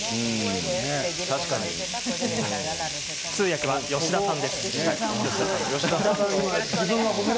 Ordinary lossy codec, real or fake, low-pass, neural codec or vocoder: none; real; none; none